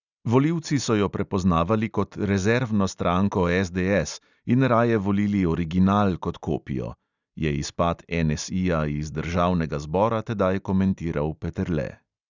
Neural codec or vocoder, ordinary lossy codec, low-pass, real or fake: none; none; 7.2 kHz; real